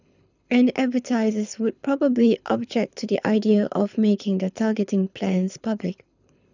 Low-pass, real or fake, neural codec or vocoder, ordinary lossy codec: 7.2 kHz; fake; codec, 24 kHz, 6 kbps, HILCodec; none